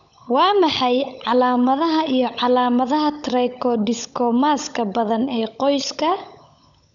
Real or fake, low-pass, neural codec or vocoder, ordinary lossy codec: fake; 7.2 kHz; codec, 16 kHz, 16 kbps, FunCodec, trained on LibriTTS, 50 frames a second; none